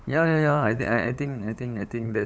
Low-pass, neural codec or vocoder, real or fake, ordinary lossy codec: none; codec, 16 kHz, 8 kbps, FunCodec, trained on LibriTTS, 25 frames a second; fake; none